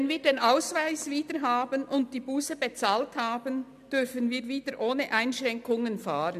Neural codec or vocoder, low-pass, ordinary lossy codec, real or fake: none; 14.4 kHz; MP3, 96 kbps; real